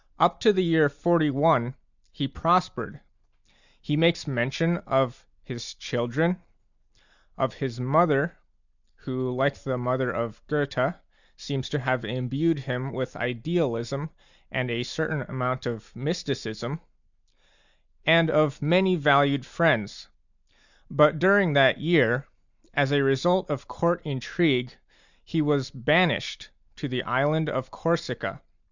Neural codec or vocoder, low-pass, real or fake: none; 7.2 kHz; real